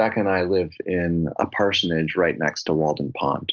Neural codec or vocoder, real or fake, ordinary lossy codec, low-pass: none; real; Opus, 24 kbps; 7.2 kHz